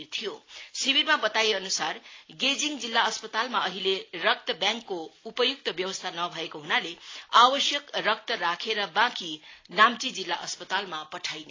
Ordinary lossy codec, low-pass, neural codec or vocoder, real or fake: AAC, 32 kbps; 7.2 kHz; none; real